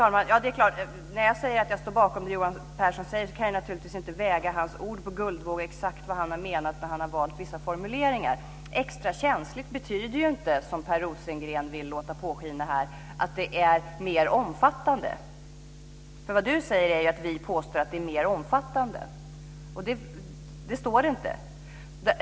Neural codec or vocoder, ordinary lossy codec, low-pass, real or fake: none; none; none; real